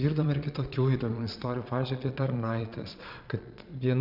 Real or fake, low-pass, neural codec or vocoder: fake; 5.4 kHz; vocoder, 22.05 kHz, 80 mel bands, Vocos